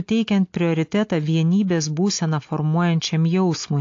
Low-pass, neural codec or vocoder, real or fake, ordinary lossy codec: 7.2 kHz; none; real; AAC, 48 kbps